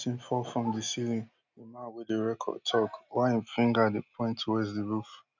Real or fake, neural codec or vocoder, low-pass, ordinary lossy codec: real; none; 7.2 kHz; none